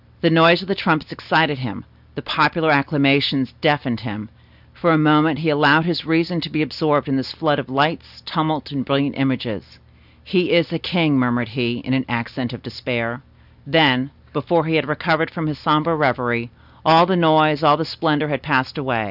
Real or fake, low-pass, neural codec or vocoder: real; 5.4 kHz; none